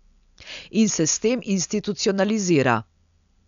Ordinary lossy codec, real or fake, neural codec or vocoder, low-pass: none; real; none; 7.2 kHz